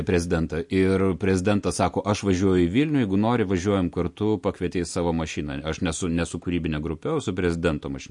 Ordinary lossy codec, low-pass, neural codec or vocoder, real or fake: MP3, 48 kbps; 10.8 kHz; none; real